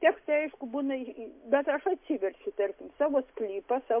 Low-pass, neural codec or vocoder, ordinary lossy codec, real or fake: 3.6 kHz; none; MP3, 32 kbps; real